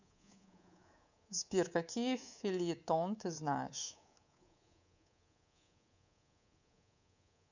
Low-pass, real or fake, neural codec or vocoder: 7.2 kHz; fake; codec, 24 kHz, 3.1 kbps, DualCodec